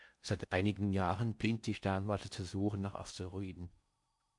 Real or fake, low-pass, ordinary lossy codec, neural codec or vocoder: fake; 10.8 kHz; MP3, 64 kbps; codec, 16 kHz in and 24 kHz out, 0.6 kbps, FocalCodec, streaming, 4096 codes